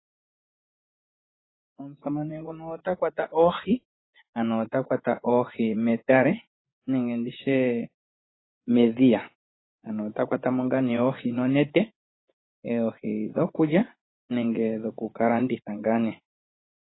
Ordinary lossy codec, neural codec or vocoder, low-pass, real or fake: AAC, 16 kbps; vocoder, 24 kHz, 100 mel bands, Vocos; 7.2 kHz; fake